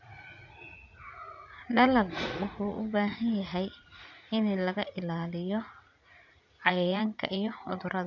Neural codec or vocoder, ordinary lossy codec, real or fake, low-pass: vocoder, 44.1 kHz, 80 mel bands, Vocos; none; fake; 7.2 kHz